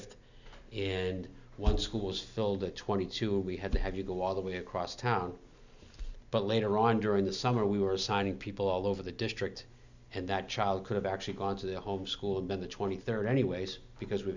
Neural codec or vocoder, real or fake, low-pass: none; real; 7.2 kHz